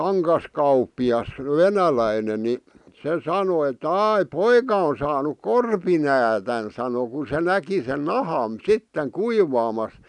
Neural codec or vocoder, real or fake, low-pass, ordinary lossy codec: none; real; 10.8 kHz; none